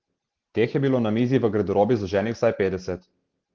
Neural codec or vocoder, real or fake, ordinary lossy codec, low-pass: none; real; Opus, 16 kbps; 7.2 kHz